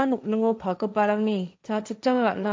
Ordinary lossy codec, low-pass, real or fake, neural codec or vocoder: none; none; fake; codec, 16 kHz, 1.1 kbps, Voila-Tokenizer